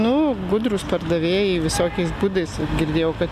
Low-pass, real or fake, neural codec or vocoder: 14.4 kHz; real; none